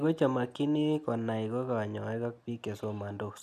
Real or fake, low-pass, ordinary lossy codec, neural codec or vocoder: real; 14.4 kHz; none; none